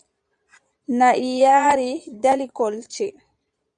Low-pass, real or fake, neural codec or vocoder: 9.9 kHz; fake; vocoder, 22.05 kHz, 80 mel bands, Vocos